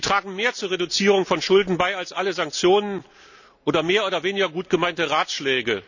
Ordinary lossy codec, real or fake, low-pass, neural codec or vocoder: none; real; 7.2 kHz; none